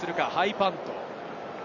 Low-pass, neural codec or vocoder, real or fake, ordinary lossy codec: 7.2 kHz; none; real; none